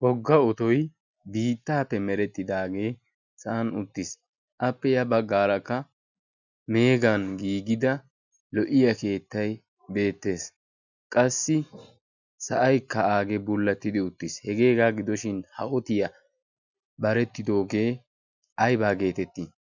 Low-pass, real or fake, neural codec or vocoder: 7.2 kHz; real; none